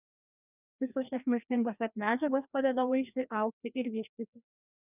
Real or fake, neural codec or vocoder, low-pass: fake; codec, 16 kHz, 1 kbps, FreqCodec, larger model; 3.6 kHz